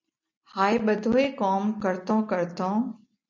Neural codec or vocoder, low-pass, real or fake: none; 7.2 kHz; real